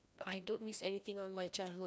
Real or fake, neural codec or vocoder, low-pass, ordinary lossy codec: fake; codec, 16 kHz, 1 kbps, FreqCodec, larger model; none; none